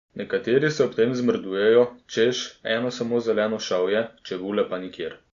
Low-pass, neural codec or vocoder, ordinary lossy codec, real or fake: 7.2 kHz; none; Opus, 64 kbps; real